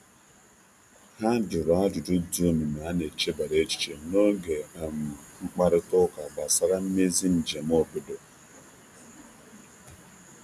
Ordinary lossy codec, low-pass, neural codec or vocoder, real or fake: none; 14.4 kHz; none; real